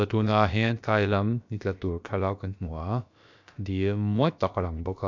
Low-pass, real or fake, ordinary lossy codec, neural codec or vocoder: 7.2 kHz; fake; MP3, 64 kbps; codec, 16 kHz, about 1 kbps, DyCAST, with the encoder's durations